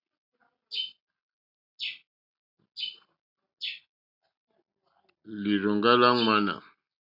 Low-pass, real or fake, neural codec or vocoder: 5.4 kHz; real; none